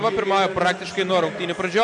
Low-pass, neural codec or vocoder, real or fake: 10.8 kHz; none; real